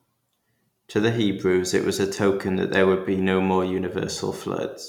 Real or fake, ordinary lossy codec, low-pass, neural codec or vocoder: real; none; 19.8 kHz; none